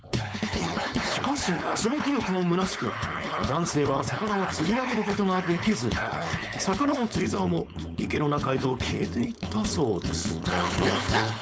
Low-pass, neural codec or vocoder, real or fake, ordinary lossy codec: none; codec, 16 kHz, 4.8 kbps, FACodec; fake; none